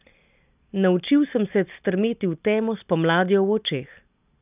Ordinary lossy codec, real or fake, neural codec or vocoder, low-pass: none; real; none; 3.6 kHz